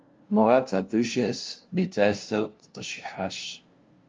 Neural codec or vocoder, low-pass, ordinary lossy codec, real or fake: codec, 16 kHz, 0.5 kbps, FunCodec, trained on LibriTTS, 25 frames a second; 7.2 kHz; Opus, 32 kbps; fake